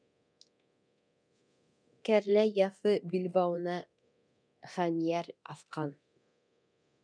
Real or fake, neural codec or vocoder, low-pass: fake; codec, 24 kHz, 0.9 kbps, DualCodec; 9.9 kHz